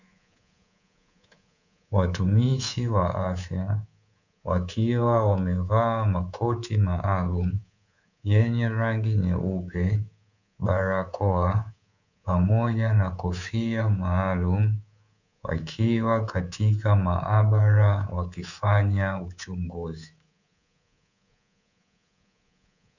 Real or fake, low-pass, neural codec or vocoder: fake; 7.2 kHz; codec, 24 kHz, 3.1 kbps, DualCodec